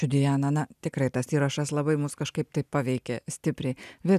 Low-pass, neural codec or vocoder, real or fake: 14.4 kHz; none; real